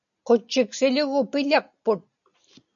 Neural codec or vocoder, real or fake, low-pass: none; real; 7.2 kHz